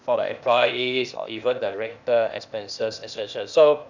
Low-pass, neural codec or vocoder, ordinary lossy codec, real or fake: 7.2 kHz; codec, 16 kHz, 0.8 kbps, ZipCodec; none; fake